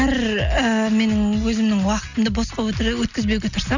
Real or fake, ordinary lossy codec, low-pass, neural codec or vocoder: real; none; 7.2 kHz; none